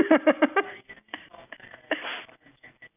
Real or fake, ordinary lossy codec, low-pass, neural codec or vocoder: real; none; 3.6 kHz; none